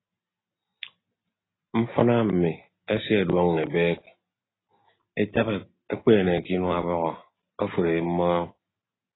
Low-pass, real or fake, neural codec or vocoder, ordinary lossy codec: 7.2 kHz; real; none; AAC, 16 kbps